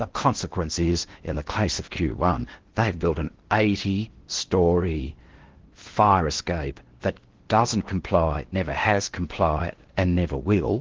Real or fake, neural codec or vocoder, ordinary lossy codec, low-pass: fake; codec, 16 kHz in and 24 kHz out, 0.8 kbps, FocalCodec, streaming, 65536 codes; Opus, 16 kbps; 7.2 kHz